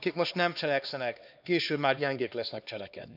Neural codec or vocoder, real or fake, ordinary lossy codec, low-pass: codec, 16 kHz, 2 kbps, X-Codec, HuBERT features, trained on LibriSpeech; fake; none; 5.4 kHz